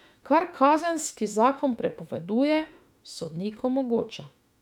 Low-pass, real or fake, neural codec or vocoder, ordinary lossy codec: 19.8 kHz; fake; autoencoder, 48 kHz, 32 numbers a frame, DAC-VAE, trained on Japanese speech; none